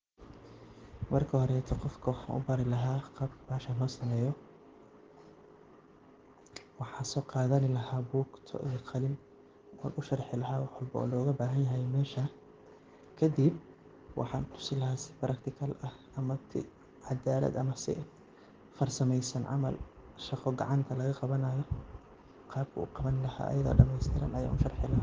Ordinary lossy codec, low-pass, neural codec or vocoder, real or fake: Opus, 16 kbps; 7.2 kHz; none; real